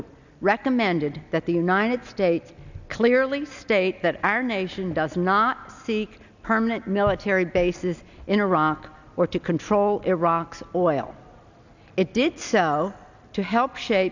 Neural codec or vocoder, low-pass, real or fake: none; 7.2 kHz; real